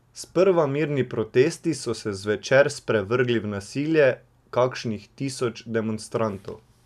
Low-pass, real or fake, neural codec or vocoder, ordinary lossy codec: 14.4 kHz; real; none; none